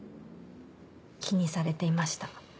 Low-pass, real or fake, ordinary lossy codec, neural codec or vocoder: none; real; none; none